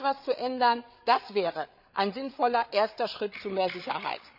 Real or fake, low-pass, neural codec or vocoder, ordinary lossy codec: fake; 5.4 kHz; codec, 16 kHz, 8 kbps, FreqCodec, larger model; none